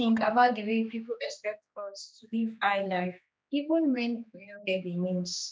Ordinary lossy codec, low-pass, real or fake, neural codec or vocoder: none; none; fake; codec, 16 kHz, 2 kbps, X-Codec, HuBERT features, trained on general audio